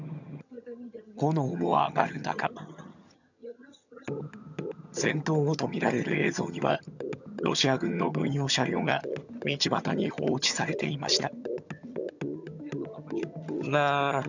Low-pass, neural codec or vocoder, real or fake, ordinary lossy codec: 7.2 kHz; vocoder, 22.05 kHz, 80 mel bands, HiFi-GAN; fake; none